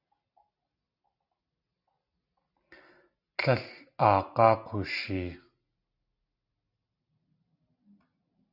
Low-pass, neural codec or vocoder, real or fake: 5.4 kHz; none; real